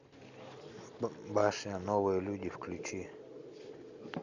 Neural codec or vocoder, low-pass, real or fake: none; 7.2 kHz; real